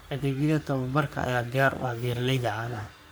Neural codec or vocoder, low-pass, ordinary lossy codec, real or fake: codec, 44.1 kHz, 3.4 kbps, Pupu-Codec; none; none; fake